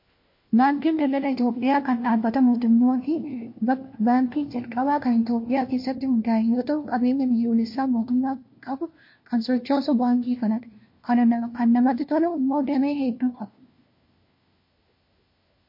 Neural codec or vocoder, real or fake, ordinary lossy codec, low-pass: codec, 16 kHz, 1 kbps, FunCodec, trained on LibriTTS, 50 frames a second; fake; MP3, 32 kbps; 5.4 kHz